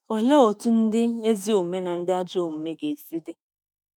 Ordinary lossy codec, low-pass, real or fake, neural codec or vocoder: none; none; fake; autoencoder, 48 kHz, 32 numbers a frame, DAC-VAE, trained on Japanese speech